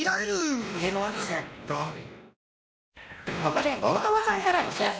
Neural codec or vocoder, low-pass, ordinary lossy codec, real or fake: codec, 16 kHz, 1 kbps, X-Codec, WavLM features, trained on Multilingual LibriSpeech; none; none; fake